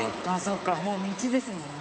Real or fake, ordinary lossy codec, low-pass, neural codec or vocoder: fake; none; none; codec, 16 kHz, 4 kbps, X-Codec, HuBERT features, trained on balanced general audio